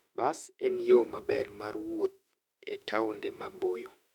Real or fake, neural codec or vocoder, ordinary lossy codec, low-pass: fake; autoencoder, 48 kHz, 32 numbers a frame, DAC-VAE, trained on Japanese speech; none; 19.8 kHz